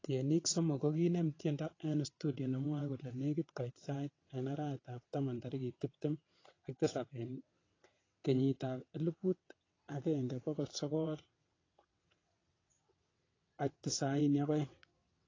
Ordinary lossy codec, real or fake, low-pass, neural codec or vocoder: AAC, 32 kbps; fake; 7.2 kHz; vocoder, 22.05 kHz, 80 mel bands, WaveNeXt